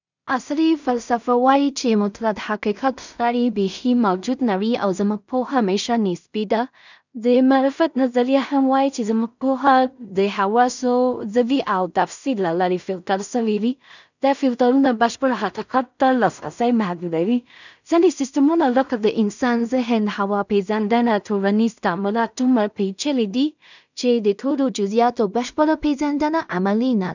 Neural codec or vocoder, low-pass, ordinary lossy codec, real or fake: codec, 16 kHz in and 24 kHz out, 0.4 kbps, LongCat-Audio-Codec, two codebook decoder; 7.2 kHz; none; fake